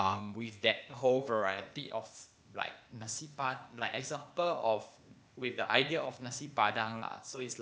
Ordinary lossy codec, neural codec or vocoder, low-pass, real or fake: none; codec, 16 kHz, 0.8 kbps, ZipCodec; none; fake